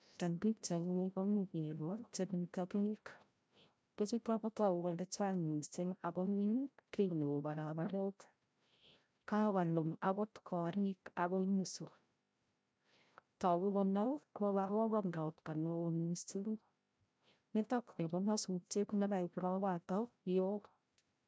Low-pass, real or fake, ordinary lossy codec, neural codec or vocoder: none; fake; none; codec, 16 kHz, 0.5 kbps, FreqCodec, larger model